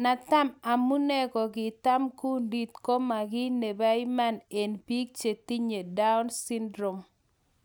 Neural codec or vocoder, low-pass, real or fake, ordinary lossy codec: none; none; real; none